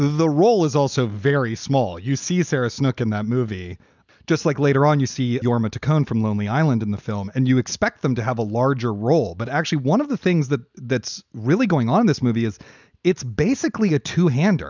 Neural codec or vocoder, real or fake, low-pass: none; real; 7.2 kHz